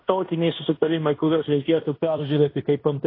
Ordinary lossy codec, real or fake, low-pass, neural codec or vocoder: AAC, 32 kbps; fake; 5.4 kHz; codec, 16 kHz in and 24 kHz out, 0.9 kbps, LongCat-Audio-Codec, fine tuned four codebook decoder